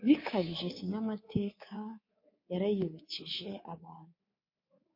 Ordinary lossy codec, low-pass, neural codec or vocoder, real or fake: MP3, 32 kbps; 5.4 kHz; codec, 24 kHz, 3.1 kbps, DualCodec; fake